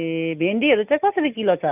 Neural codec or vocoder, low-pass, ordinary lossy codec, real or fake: none; 3.6 kHz; none; real